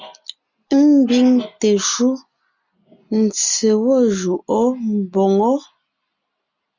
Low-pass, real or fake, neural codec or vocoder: 7.2 kHz; real; none